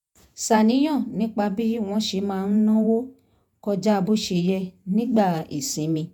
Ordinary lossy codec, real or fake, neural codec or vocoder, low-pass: none; fake; vocoder, 48 kHz, 128 mel bands, Vocos; 19.8 kHz